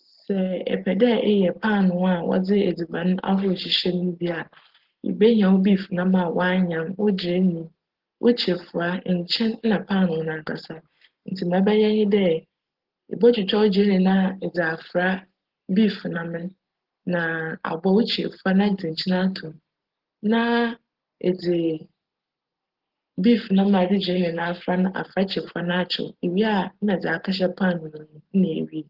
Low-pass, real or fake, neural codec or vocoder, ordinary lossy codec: 5.4 kHz; real; none; Opus, 16 kbps